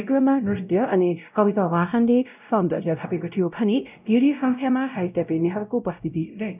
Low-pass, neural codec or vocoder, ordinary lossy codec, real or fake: 3.6 kHz; codec, 16 kHz, 0.5 kbps, X-Codec, WavLM features, trained on Multilingual LibriSpeech; none; fake